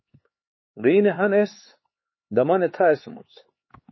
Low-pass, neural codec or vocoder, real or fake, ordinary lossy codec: 7.2 kHz; codec, 16 kHz, 4 kbps, X-Codec, HuBERT features, trained on LibriSpeech; fake; MP3, 24 kbps